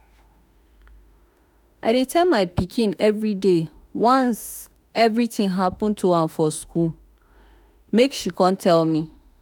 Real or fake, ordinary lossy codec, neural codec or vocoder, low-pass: fake; none; autoencoder, 48 kHz, 32 numbers a frame, DAC-VAE, trained on Japanese speech; 19.8 kHz